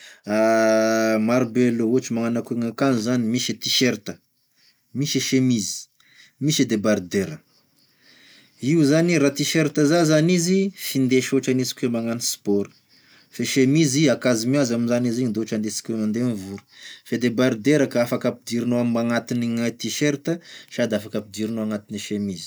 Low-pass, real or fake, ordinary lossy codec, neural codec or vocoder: none; real; none; none